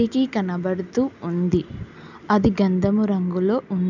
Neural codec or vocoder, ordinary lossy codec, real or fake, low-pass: none; none; real; 7.2 kHz